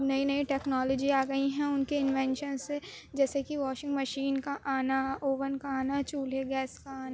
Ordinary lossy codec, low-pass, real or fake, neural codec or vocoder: none; none; real; none